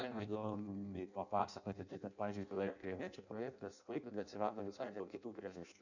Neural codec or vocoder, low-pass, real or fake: codec, 16 kHz in and 24 kHz out, 0.6 kbps, FireRedTTS-2 codec; 7.2 kHz; fake